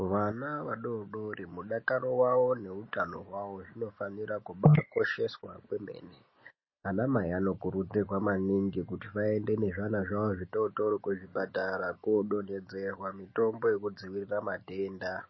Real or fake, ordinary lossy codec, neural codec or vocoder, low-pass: real; MP3, 24 kbps; none; 7.2 kHz